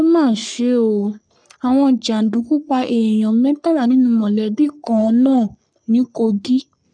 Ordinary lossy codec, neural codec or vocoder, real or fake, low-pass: none; codec, 44.1 kHz, 3.4 kbps, Pupu-Codec; fake; 9.9 kHz